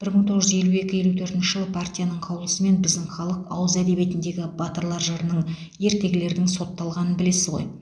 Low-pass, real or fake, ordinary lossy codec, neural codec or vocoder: 9.9 kHz; real; none; none